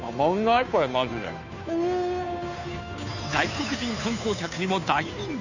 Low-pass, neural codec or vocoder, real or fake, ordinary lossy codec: 7.2 kHz; codec, 16 kHz, 2 kbps, FunCodec, trained on Chinese and English, 25 frames a second; fake; none